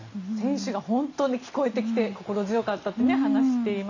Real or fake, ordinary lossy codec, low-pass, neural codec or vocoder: real; none; 7.2 kHz; none